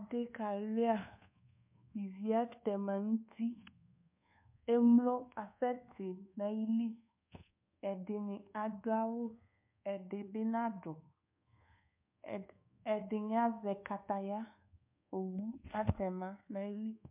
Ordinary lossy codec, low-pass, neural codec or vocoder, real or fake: MP3, 32 kbps; 3.6 kHz; codec, 24 kHz, 1.2 kbps, DualCodec; fake